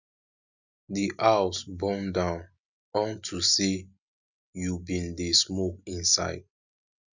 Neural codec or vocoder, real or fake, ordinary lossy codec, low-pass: none; real; none; 7.2 kHz